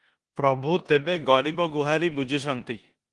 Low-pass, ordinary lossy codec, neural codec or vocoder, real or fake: 10.8 kHz; Opus, 24 kbps; codec, 16 kHz in and 24 kHz out, 0.9 kbps, LongCat-Audio-Codec, four codebook decoder; fake